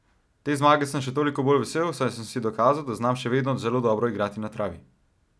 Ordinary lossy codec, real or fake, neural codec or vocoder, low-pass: none; real; none; none